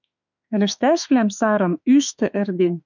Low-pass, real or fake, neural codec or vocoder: 7.2 kHz; fake; autoencoder, 48 kHz, 32 numbers a frame, DAC-VAE, trained on Japanese speech